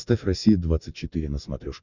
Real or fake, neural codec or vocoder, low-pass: real; none; 7.2 kHz